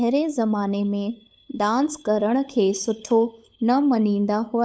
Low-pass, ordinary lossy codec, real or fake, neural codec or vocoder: none; none; fake; codec, 16 kHz, 8 kbps, FunCodec, trained on LibriTTS, 25 frames a second